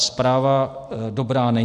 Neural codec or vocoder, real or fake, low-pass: none; real; 10.8 kHz